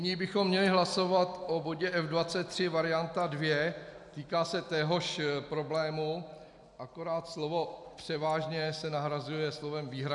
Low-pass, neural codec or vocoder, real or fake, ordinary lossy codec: 10.8 kHz; none; real; MP3, 96 kbps